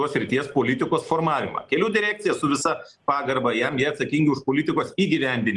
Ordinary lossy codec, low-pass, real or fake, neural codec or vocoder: Opus, 24 kbps; 10.8 kHz; real; none